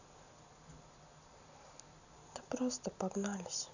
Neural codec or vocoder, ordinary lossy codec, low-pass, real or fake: none; none; 7.2 kHz; real